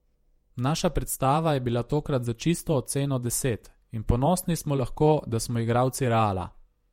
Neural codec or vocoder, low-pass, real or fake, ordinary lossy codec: vocoder, 48 kHz, 128 mel bands, Vocos; 19.8 kHz; fake; MP3, 64 kbps